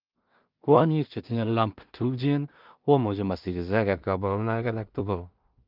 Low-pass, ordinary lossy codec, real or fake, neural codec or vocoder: 5.4 kHz; Opus, 32 kbps; fake; codec, 16 kHz in and 24 kHz out, 0.4 kbps, LongCat-Audio-Codec, two codebook decoder